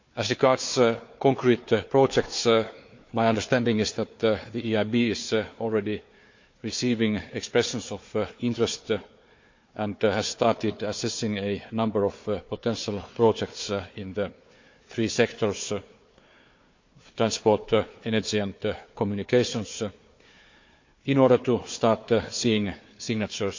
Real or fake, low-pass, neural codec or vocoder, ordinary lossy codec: fake; 7.2 kHz; codec, 16 kHz, 4 kbps, FunCodec, trained on Chinese and English, 50 frames a second; MP3, 48 kbps